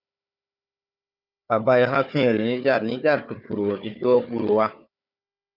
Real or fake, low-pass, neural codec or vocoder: fake; 5.4 kHz; codec, 16 kHz, 4 kbps, FunCodec, trained on Chinese and English, 50 frames a second